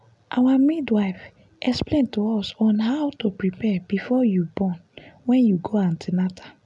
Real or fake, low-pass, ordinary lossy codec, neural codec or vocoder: real; 10.8 kHz; none; none